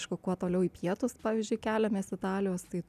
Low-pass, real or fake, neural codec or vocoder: 14.4 kHz; real; none